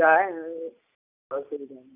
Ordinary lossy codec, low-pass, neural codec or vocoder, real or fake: none; 3.6 kHz; none; real